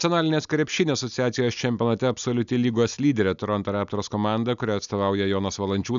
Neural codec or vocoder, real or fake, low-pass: none; real; 7.2 kHz